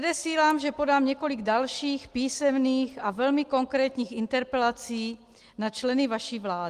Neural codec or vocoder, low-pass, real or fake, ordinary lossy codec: none; 14.4 kHz; real; Opus, 24 kbps